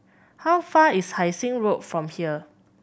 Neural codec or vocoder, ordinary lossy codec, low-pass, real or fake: none; none; none; real